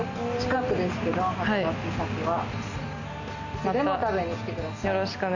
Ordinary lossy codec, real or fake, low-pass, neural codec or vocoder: none; real; 7.2 kHz; none